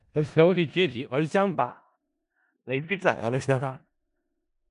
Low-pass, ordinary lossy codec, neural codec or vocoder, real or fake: 10.8 kHz; none; codec, 16 kHz in and 24 kHz out, 0.4 kbps, LongCat-Audio-Codec, four codebook decoder; fake